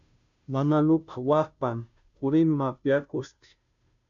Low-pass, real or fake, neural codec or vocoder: 7.2 kHz; fake; codec, 16 kHz, 0.5 kbps, FunCodec, trained on Chinese and English, 25 frames a second